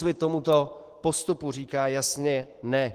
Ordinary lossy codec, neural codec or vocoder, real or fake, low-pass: Opus, 16 kbps; autoencoder, 48 kHz, 128 numbers a frame, DAC-VAE, trained on Japanese speech; fake; 14.4 kHz